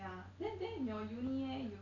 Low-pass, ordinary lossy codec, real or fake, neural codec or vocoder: 7.2 kHz; none; real; none